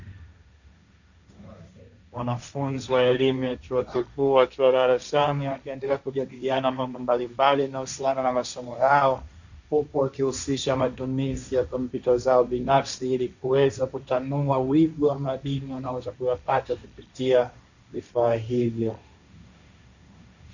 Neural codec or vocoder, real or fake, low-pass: codec, 16 kHz, 1.1 kbps, Voila-Tokenizer; fake; 7.2 kHz